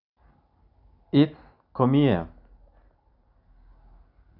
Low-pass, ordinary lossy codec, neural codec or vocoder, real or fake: 5.4 kHz; none; none; real